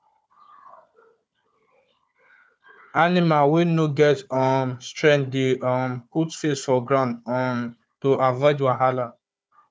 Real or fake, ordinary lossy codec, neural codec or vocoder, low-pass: fake; none; codec, 16 kHz, 4 kbps, FunCodec, trained on Chinese and English, 50 frames a second; none